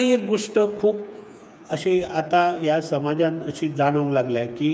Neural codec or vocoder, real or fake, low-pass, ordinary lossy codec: codec, 16 kHz, 4 kbps, FreqCodec, smaller model; fake; none; none